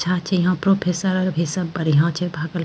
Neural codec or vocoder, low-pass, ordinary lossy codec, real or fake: none; none; none; real